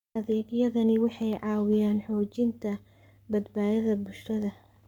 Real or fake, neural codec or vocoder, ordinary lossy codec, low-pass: fake; codec, 44.1 kHz, 7.8 kbps, Pupu-Codec; none; 19.8 kHz